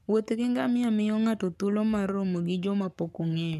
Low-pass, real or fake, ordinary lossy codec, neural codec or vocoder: 14.4 kHz; fake; none; codec, 44.1 kHz, 7.8 kbps, Pupu-Codec